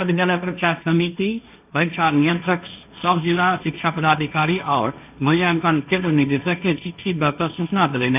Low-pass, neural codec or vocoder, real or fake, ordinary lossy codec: 3.6 kHz; codec, 16 kHz, 1.1 kbps, Voila-Tokenizer; fake; AAC, 32 kbps